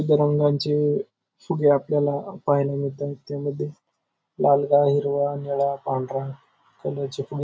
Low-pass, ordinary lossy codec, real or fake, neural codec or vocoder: none; none; real; none